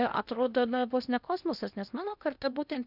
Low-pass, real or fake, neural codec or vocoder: 5.4 kHz; fake; codec, 16 kHz in and 24 kHz out, 0.8 kbps, FocalCodec, streaming, 65536 codes